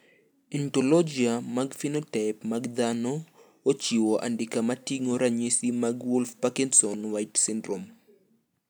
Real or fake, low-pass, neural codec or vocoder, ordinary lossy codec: real; none; none; none